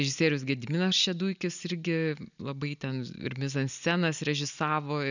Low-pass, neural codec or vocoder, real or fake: 7.2 kHz; none; real